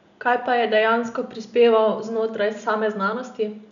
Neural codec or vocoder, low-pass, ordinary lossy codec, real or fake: none; 7.2 kHz; none; real